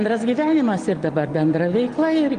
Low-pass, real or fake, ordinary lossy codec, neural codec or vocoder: 9.9 kHz; real; Opus, 24 kbps; none